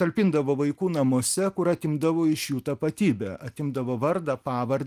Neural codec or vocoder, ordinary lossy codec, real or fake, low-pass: none; Opus, 32 kbps; real; 14.4 kHz